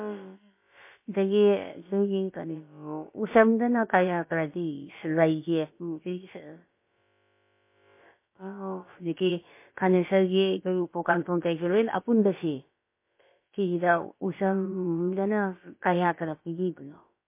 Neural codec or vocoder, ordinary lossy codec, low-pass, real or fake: codec, 16 kHz, about 1 kbps, DyCAST, with the encoder's durations; MP3, 24 kbps; 3.6 kHz; fake